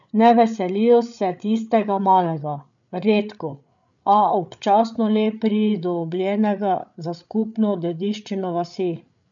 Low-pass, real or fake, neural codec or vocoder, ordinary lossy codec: 7.2 kHz; fake; codec, 16 kHz, 8 kbps, FreqCodec, larger model; none